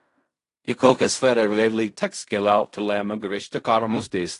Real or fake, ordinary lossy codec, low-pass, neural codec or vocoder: fake; AAC, 48 kbps; 10.8 kHz; codec, 16 kHz in and 24 kHz out, 0.4 kbps, LongCat-Audio-Codec, fine tuned four codebook decoder